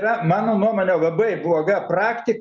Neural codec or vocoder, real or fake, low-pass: none; real; 7.2 kHz